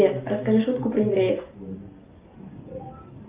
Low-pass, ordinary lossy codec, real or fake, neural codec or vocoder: 3.6 kHz; Opus, 32 kbps; real; none